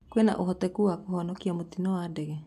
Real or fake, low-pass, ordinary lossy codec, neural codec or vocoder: real; 14.4 kHz; none; none